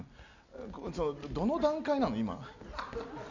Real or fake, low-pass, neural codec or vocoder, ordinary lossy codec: real; 7.2 kHz; none; none